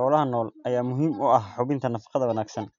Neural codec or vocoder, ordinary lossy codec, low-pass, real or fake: none; MP3, 96 kbps; 7.2 kHz; real